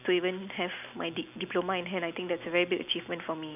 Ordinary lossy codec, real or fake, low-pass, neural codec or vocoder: none; real; 3.6 kHz; none